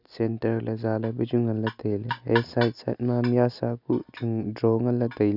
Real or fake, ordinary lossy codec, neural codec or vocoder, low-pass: real; none; none; 5.4 kHz